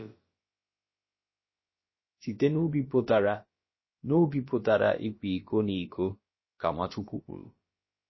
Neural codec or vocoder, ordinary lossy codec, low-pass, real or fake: codec, 16 kHz, about 1 kbps, DyCAST, with the encoder's durations; MP3, 24 kbps; 7.2 kHz; fake